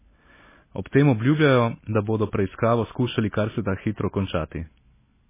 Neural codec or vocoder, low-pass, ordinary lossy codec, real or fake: none; 3.6 kHz; MP3, 16 kbps; real